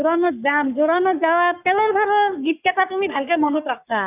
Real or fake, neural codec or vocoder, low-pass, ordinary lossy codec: fake; codec, 44.1 kHz, 3.4 kbps, Pupu-Codec; 3.6 kHz; none